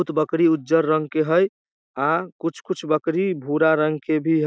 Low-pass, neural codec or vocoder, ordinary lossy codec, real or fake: none; none; none; real